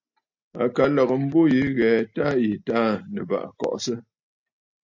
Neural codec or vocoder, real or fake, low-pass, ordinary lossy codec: none; real; 7.2 kHz; MP3, 48 kbps